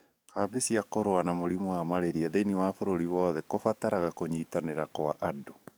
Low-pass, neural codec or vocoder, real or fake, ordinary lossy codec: none; codec, 44.1 kHz, 7.8 kbps, DAC; fake; none